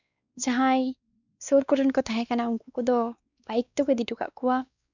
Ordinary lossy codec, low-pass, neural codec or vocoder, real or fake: none; 7.2 kHz; codec, 16 kHz, 2 kbps, X-Codec, WavLM features, trained on Multilingual LibriSpeech; fake